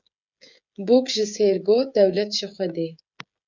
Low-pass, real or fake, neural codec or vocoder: 7.2 kHz; fake; codec, 16 kHz, 16 kbps, FreqCodec, smaller model